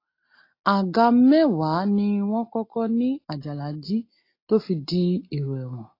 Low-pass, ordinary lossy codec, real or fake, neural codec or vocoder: 5.4 kHz; AAC, 32 kbps; real; none